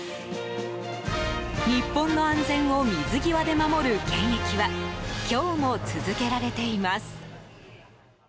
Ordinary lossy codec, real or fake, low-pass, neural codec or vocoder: none; real; none; none